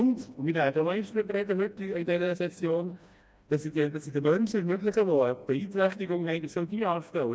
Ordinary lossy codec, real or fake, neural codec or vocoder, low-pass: none; fake; codec, 16 kHz, 1 kbps, FreqCodec, smaller model; none